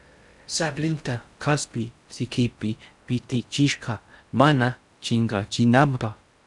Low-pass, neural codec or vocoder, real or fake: 10.8 kHz; codec, 16 kHz in and 24 kHz out, 0.6 kbps, FocalCodec, streaming, 4096 codes; fake